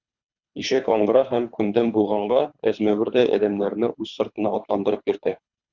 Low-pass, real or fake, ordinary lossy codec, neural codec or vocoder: 7.2 kHz; fake; Opus, 64 kbps; codec, 24 kHz, 3 kbps, HILCodec